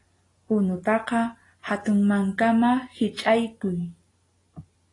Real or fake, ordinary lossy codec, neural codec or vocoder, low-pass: real; AAC, 32 kbps; none; 10.8 kHz